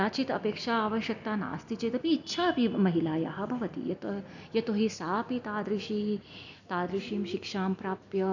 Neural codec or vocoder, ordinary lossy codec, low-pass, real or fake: none; none; 7.2 kHz; real